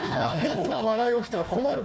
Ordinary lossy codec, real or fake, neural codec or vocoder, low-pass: none; fake; codec, 16 kHz, 1 kbps, FunCodec, trained on Chinese and English, 50 frames a second; none